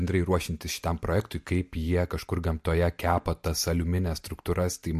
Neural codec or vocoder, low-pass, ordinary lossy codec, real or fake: none; 14.4 kHz; MP3, 64 kbps; real